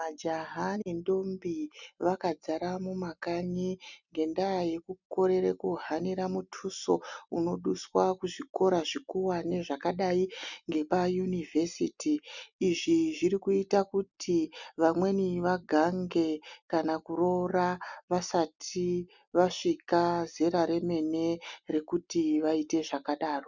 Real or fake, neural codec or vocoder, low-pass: real; none; 7.2 kHz